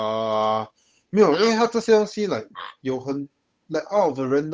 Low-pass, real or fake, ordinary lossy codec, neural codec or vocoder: none; fake; none; codec, 16 kHz, 8 kbps, FunCodec, trained on Chinese and English, 25 frames a second